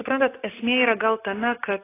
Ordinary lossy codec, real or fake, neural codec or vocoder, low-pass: AAC, 16 kbps; real; none; 3.6 kHz